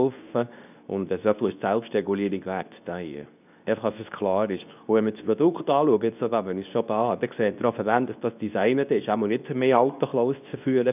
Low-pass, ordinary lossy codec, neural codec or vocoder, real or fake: 3.6 kHz; none; codec, 24 kHz, 0.9 kbps, WavTokenizer, medium speech release version 2; fake